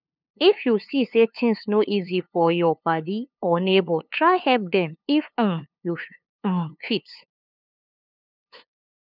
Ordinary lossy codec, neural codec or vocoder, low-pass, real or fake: none; codec, 16 kHz, 2 kbps, FunCodec, trained on LibriTTS, 25 frames a second; 5.4 kHz; fake